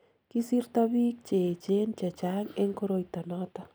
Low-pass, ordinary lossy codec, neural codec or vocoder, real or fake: none; none; none; real